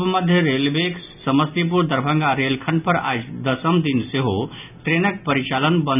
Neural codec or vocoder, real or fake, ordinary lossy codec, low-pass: none; real; none; 3.6 kHz